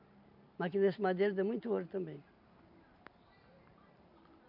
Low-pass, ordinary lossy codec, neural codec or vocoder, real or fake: 5.4 kHz; none; vocoder, 44.1 kHz, 128 mel bands every 512 samples, BigVGAN v2; fake